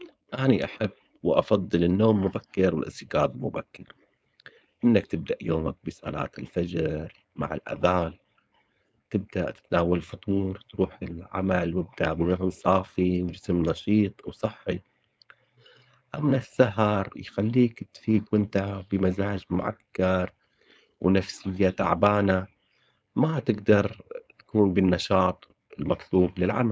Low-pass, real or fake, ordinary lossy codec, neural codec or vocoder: none; fake; none; codec, 16 kHz, 4.8 kbps, FACodec